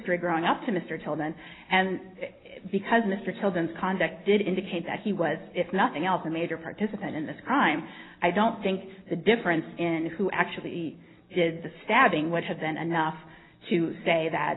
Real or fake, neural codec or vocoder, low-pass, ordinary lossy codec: real; none; 7.2 kHz; AAC, 16 kbps